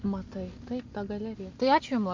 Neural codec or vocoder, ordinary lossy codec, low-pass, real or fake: none; MP3, 64 kbps; 7.2 kHz; real